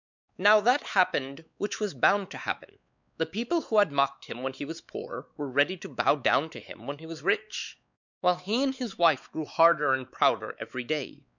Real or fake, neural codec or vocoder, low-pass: fake; codec, 16 kHz, 4 kbps, X-Codec, WavLM features, trained on Multilingual LibriSpeech; 7.2 kHz